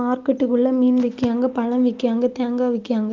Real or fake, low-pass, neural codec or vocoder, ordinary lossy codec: real; 7.2 kHz; none; Opus, 32 kbps